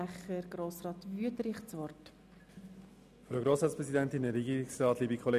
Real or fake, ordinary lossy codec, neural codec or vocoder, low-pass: real; none; none; 14.4 kHz